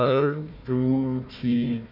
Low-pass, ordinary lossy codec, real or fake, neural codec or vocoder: 5.4 kHz; none; fake; codec, 16 kHz, 1 kbps, FunCodec, trained on Chinese and English, 50 frames a second